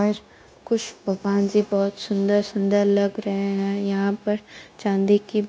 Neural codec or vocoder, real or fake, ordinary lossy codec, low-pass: codec, 16 kHz, 0.9 kbps, LongCat-Audio-Codec; fake; none; none